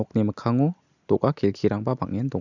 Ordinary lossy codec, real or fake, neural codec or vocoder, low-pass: none; real; none; 7.2 kHz